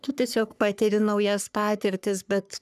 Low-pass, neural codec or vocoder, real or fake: 14.4 kHz; codec, 44.1 kHz, 3.4 kbps, Pupu-Codec; fake